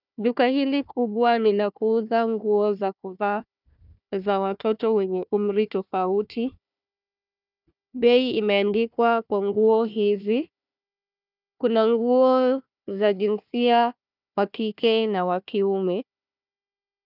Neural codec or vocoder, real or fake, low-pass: codec, 16 kHz, 1 kbps, FunCodec, trained on Chinese and English, 50 frames a second; fake; 5.4 kHz